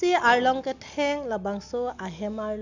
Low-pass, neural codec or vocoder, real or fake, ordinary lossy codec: 7.2 kHz; none; real; none